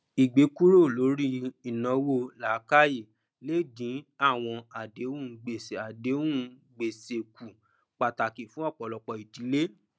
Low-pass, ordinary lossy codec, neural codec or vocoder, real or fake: none; none; none; real